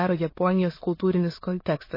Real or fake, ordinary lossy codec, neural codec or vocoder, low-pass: fake; MP3, 24 kbps; autoencoder, 22.05 kHz, a latent of 192 numbers a frame, VITS, trained on many speakers; 5.4 kHz